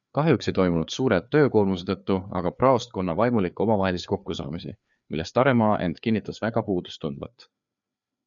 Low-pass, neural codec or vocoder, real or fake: 7.2 kHz; codec, 16 kHz, 4 kbps, FreqCodec, larger model; fake